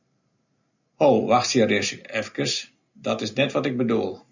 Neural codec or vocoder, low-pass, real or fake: none; 7.2 kHz; real